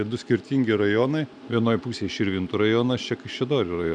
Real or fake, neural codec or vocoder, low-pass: real; none; 9.9 kHz